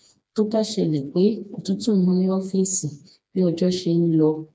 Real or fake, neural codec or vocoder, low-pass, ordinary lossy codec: fake; codec, 16 kHz, 2 kbps, FreqCodec, smaller model; none; none